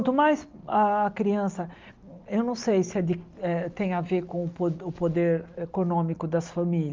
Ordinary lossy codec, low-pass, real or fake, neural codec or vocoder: Opus, 24 kbps; 7.2 kHz; real; none